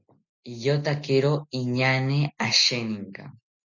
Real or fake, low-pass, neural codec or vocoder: real; 7.2 kHz; none